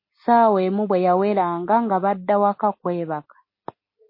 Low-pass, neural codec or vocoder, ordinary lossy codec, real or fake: 5.4 kHz; none; MP3, 24 kbps; real